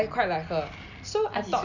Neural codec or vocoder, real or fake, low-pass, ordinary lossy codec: none; real; 7.2 kHz; none